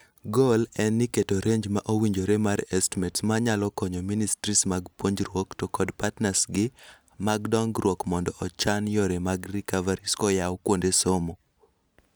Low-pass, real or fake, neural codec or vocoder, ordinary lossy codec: none; real; none; none